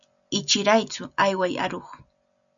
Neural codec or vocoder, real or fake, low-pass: none; real; 7.2 kHz